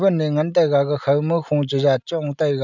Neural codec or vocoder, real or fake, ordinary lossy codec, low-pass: none; real; none; 7.2 kHz